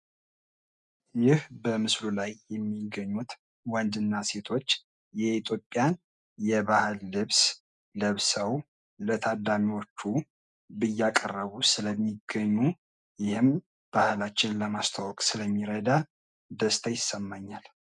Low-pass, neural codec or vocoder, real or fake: 10.8 kHz; none; real